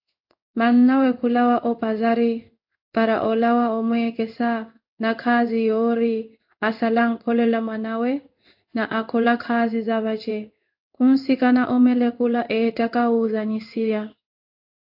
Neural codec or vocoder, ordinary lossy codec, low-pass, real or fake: codec, 16 kHz in and 24 kHz out, 1 kbps, XY-Tokenizer; AAC, 48 kbps; 5.4 kHz; fake